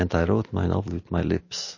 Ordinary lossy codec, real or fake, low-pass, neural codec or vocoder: MP3, 32 kbps; real; 7.2 kHz; none